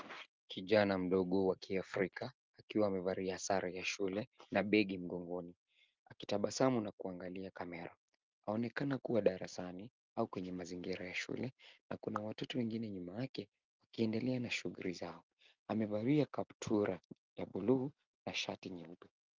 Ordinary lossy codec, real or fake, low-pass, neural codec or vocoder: Opus, 32 kbps; real; 7.2 kHz; none